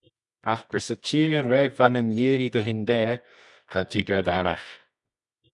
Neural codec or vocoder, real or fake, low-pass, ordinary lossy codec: codec, 24 kHz, 0.9 kbps, WavTokenizer, medium music audio release; fake; 10.8 kHz; MP3, 96 kbps